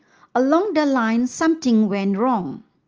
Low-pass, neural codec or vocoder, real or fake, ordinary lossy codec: 7.2 kHz; none; real; Opus, 24 kbps